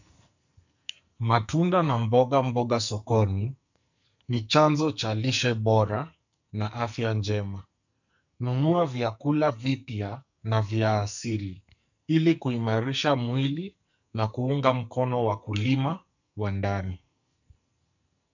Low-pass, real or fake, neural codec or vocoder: 7.2 kHz; fake; codec, 44.1 kHz, 2.6 kbps, SNAC